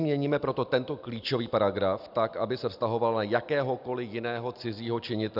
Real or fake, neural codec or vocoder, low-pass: real; none; 5.4 kHz